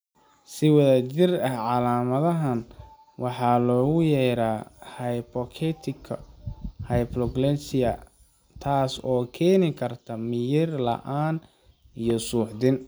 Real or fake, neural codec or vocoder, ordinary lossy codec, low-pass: real; none; none; none